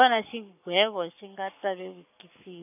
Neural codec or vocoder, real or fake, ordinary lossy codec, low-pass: codec, 44.1 kHz, 7.8 kbps, Pupu-Codec; fake; none; 3.6 kHz